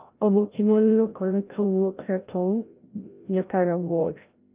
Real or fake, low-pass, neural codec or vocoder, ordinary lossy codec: fake; 3.6 kHz; codec, 16 kHz, 0.5 kbps, FreqCodec, larger model; Opus, 32 kbps